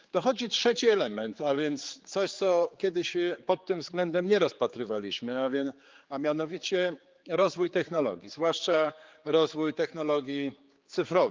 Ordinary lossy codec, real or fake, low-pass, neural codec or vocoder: Opus, 32 kbps; fake; 7.2 kHz; codec, 16 kHz, 4 kbps, X-Codec, HuBERT features, trained on general audio